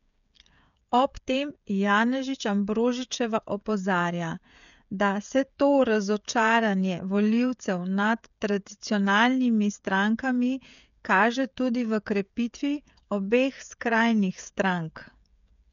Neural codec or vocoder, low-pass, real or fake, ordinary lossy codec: codec, 16 kHz, 16 kbps, FreqCodec, smaller model; 7.2 kHz; fake; none